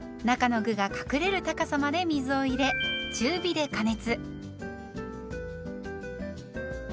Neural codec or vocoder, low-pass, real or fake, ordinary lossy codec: none; none; real; none